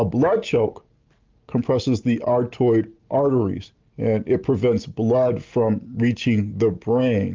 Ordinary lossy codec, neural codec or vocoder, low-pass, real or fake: Opus, 32 kbps; none; 7.2 kHz; real